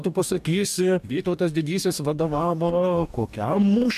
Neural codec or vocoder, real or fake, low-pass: codec, 44.1 kHz, 2.6 kbps, DAC; fake; 14.4 kHz